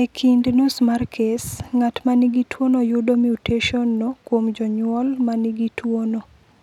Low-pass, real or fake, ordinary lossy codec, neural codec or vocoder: 19.8 kHz; real; none; none